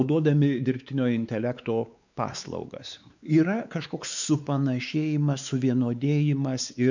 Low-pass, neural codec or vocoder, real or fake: 7.2 kHz; codec, 16 kHz, 4 kbps, X-Codec, WavLM features, trained on Multilingual LibriSpeech; fake